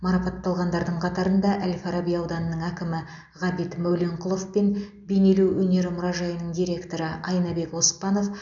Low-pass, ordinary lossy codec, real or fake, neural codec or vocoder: 7.2 kHz; none; real; none